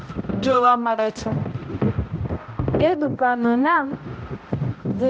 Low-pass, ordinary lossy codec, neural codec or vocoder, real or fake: none; none; codec, 16 kHz, 0.5 kbps, X-Codec, HuBERT features, trained on balanced general audio; fake